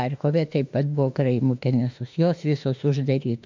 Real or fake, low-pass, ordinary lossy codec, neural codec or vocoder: fake; 7.2 kHz; MP3, 64 kbps; autoencoder, 48 kHz, 32 numbers a frame, DAC-VAE, trained on Japanese speech